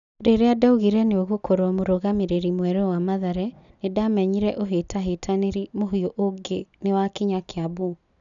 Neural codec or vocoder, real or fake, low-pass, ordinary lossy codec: none; real; 7.2 kHz; none